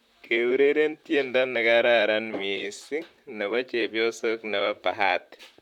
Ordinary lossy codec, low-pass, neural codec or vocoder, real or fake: none; 19.8 kHz; vocoder, 44.1 kHz, 128 mel bands, Pupu-Vocoder; fake